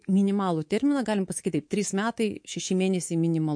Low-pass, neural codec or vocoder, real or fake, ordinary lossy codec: 9.9 kHz; codec, 24 kHz, 3.1 kbps, DualCodec; fake; MP3, 48 kbps